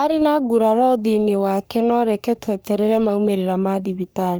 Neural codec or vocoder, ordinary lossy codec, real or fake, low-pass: codec, 44.1 kHz, 3.4 kbps, Pupu-Codec; none; fake; none